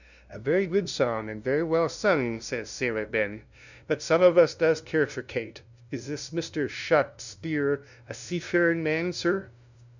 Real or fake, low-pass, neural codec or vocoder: fake; 7.2 kHz; codec, 16 kHz, 0.5 kbps, FunCodec, trained on LibriTTS, 25 frames a second